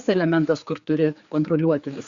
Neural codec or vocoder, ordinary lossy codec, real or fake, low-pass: codec, 16 kHz, 4 kbps, X-Codec, HuBERT features, trained on general audio; Opus, 64 kbps; fake; 7.2 kHz